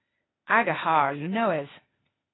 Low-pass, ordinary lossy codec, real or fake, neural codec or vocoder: 7.2 kHz; AAC, 16 kbps; fake; codec, 16 kHz, 0.8 kbps, ZipCodec